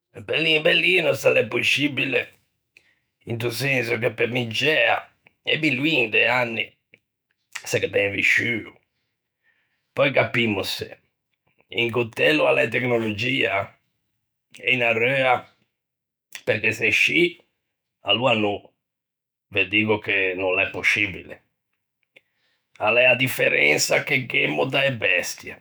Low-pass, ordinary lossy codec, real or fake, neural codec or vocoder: none; none; fake; autoencoder, 48 kHz, 128 numbers a frame, DAC-VAE, trained on Japanese speech